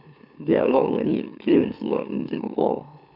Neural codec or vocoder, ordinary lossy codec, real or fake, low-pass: autoencoder, 44.1 kHz, a latent of 192 numbers a frame, MeloTTS; none; fake; 5.4 kHz